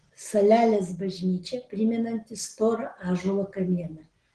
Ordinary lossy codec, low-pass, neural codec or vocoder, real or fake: Opus, 16 kbps; 9.9 kHz; none; real